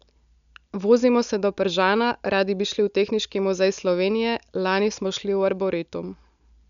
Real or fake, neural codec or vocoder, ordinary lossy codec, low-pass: real; none; none; 7.2 kHz